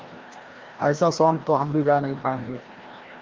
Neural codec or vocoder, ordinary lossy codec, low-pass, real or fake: codec, 16 kHz, 1 kbps, FreqCodec, larger model; Opus, 32 kbps; 7.2 kHz; fake